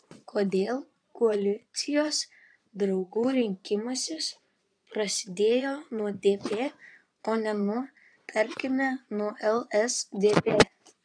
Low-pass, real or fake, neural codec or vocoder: 9.9 kHz; fake; vocoder, 22.05 kHz, 80 mel bands, Vocos